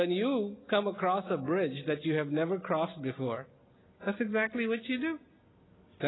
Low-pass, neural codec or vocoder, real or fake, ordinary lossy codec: 7.2 kHz; none; real; AAC, 16 kbps